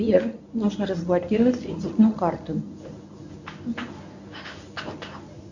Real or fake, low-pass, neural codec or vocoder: fake; 7.2 kHz; codec, 24 kHz, 0.9 kbps, WavTokenizer, medium speech release version 1